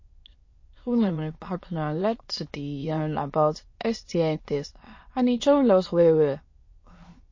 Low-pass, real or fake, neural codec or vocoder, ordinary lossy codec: 7.2 kHz; fake; autoencoder, 22.05 kHz, a latent of 192 numbers a frame, VITS, trained on many speakers; MP3, 32 kbps